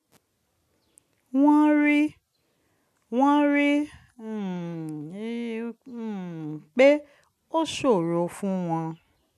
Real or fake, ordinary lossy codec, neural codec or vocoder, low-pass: real; none; none; 14.4 kHz